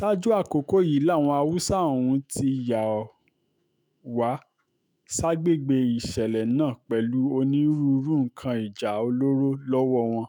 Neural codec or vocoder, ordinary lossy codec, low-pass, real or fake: autoencoder, 48 kHz, 128 numbers a frame, DAC-VAE, trained on Japanese speech; none; none; fake